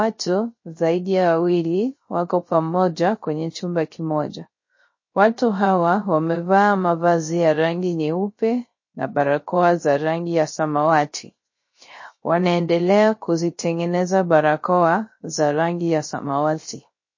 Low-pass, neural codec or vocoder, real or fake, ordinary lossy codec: 7.2 kHz; codec, 16 kHz, 0.3 kbps, FocalCodec; fake; MP3, 32 kbps